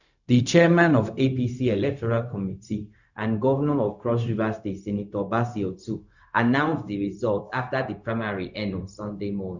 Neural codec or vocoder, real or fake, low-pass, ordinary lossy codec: codec, 16 kHz, 0.4 kbps, LongCat-Audio-Codec; fake; 7.2 kHz; none